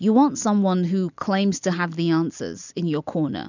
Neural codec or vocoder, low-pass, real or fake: none; 7.2 kHz; real